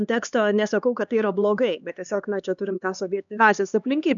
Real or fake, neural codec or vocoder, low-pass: fake; codec, 16 kHz, 2 kbps, X-Codec, HuBERT features, trained on LibriSpeech; 7.2 kHz